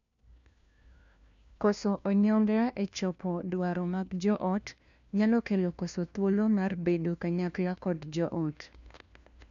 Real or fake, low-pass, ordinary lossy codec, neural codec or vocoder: fake; 7.2 kHz; none; codec, 16 kHz, 1 kbps, FunCodec, trained on LibriTTS, 50 frames a second